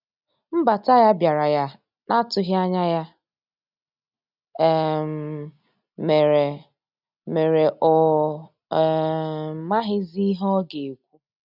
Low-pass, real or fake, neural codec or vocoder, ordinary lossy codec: 5.4 kHz; real; none; none